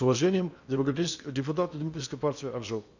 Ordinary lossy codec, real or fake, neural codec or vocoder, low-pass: none; fake; codec, 16 kHz in and 24 kHz out, 0.8 kbps, FocalCodec, streaming, 65536 codes; 7.2 kHz